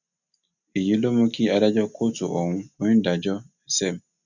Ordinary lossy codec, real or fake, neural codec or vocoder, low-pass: none; real; none; 7.2 kHz